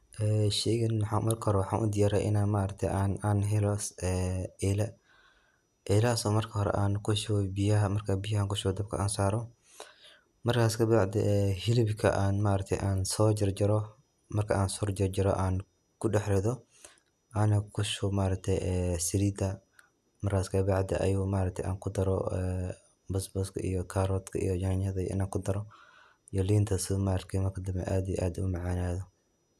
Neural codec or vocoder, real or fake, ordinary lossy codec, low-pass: none; real; none; 14.4 kHz